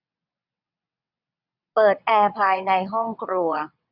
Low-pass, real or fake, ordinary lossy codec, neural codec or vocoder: 5.4 kHz; fake; none; vocoder, 22.05 kHz, 80 mel bands, WaveNeXt